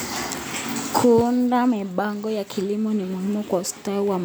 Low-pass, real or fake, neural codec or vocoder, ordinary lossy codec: none; real; none; none